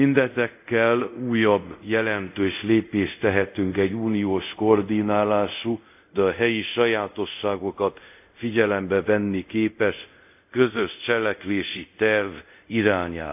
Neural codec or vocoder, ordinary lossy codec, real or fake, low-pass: codec, 24 kHz, 0.5 kbps, DualCodec; AAC, 32 kbps; fake; 3.6 kHz